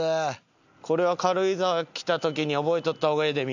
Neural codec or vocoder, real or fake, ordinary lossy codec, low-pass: none; real; none; 7.2 kHz